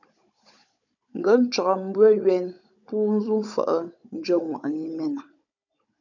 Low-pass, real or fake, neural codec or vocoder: 7.2 kHz; fake; codec, 16 kHz, 16 kbps, FunCodec, trained on Chinese and English, 50 frames a second